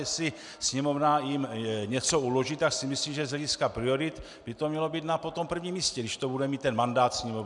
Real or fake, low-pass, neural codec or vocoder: real; 10.8 kHz; none